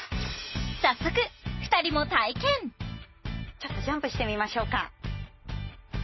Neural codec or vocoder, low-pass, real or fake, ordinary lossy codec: none; 7.2 kHz; real; MP3, 24 kbps